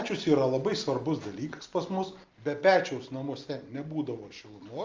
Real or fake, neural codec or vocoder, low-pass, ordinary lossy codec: real; none; 7.2 kHz; Opus, 32 kbps